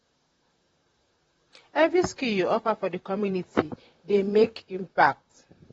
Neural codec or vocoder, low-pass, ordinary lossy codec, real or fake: vocoder, 44.1 kHz, 128 mel bands, Pupu-Vocoder; 19.8 kHz; AAC, 24 kbps; fake